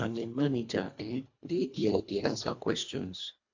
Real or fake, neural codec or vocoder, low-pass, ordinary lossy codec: fake; codec, 24 kHz, 1.5 kbps, HILCodec; 7.2 kHz; AAC, 48 kbps